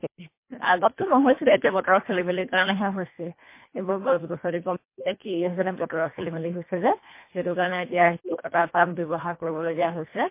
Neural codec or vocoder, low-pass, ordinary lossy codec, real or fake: codec, 24 kHz, 1.5 kbps, HILCodec; 3.6 kHz; MP3, 24 kbps; fake